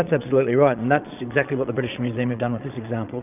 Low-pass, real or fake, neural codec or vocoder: 3.6 kHz; fake; vocoder, 22.05 kHz, 80 mel bands, Vocos